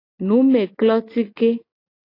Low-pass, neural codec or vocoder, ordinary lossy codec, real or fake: 5.4 kHz; none; AAC, 32 kbps; real